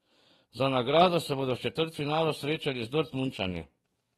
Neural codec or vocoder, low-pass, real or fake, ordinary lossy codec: codec, 44.1 kHz, 7.8 kbps, DAC; 19.8 kHz; fake; AAC, 32 kbps